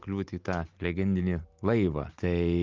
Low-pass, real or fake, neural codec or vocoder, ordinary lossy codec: 7.2 kHz; real; none; Opus, 24 kbps